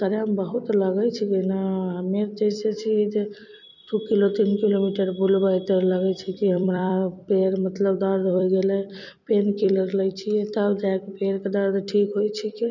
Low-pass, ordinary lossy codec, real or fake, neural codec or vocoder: 7.2 kHz; none; fake; vocoder, 44.1 kHz, 128 mel bands every 256 samples, BigVGAN v2